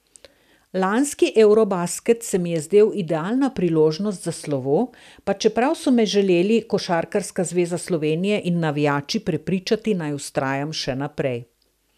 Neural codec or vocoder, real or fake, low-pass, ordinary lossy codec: none; real; 14.4 kHz; none